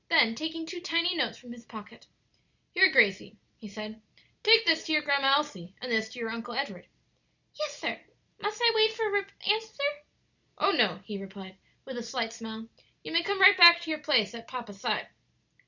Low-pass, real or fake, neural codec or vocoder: 7.2 kHz; real; none